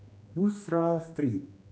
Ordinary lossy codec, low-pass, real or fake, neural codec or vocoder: none; none; fake; codec, 16 kHz, 1 kbps, X-Codec, HuBERT features, trained on general audio